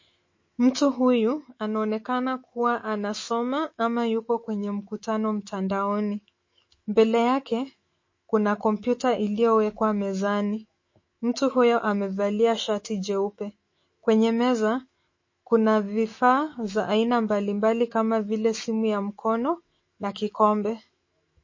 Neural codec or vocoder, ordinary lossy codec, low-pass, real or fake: autoencoder, 48 kHz, 128 numbers a frame, DAC-VAE, trained on Japanese speech; MP3, 32 kbps; 7.2 kHz; fake